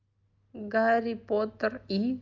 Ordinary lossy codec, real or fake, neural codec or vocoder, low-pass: Opus, 24 kbps; real; none; 7.2 kHz